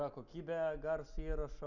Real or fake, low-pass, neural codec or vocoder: real; 7.2 kHz; none